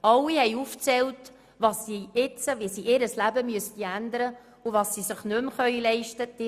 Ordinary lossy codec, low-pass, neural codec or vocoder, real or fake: Opus, 64 kbps; 14.4 kHz; none; real